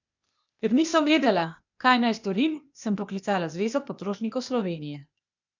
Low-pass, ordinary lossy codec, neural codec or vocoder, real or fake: 7.2 kHz; none; codec, 16 kHz, 0.8 kbps, ZipCodec; fake